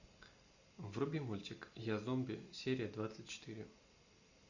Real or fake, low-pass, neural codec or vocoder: real; 7.2 kHz; none